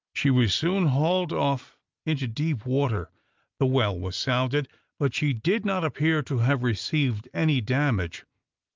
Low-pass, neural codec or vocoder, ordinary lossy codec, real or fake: 7.2 kHz; none; Opus, 32 kbps; real